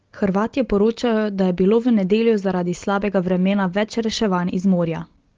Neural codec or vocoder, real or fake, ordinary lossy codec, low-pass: none; real; Opus, 16 kbps; 7.2 kHz